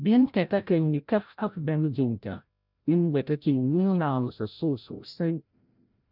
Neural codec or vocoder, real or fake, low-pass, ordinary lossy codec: codec, 16 kHz, 0.5 kbps, FreqCodec, larger model; fake; 5.4 kHz; none